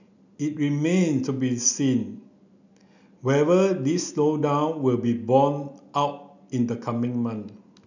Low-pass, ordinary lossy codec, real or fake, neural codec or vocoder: 7.2 kHz; none; real; none